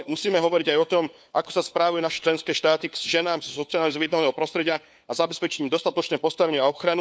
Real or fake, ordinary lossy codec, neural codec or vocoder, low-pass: fake; none; codec, 16 kHz, 4 kbps, FunCodec, trained on LibriTTS, 50 frames a second; none